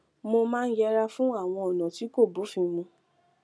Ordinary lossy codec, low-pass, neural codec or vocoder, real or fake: none; none; none; real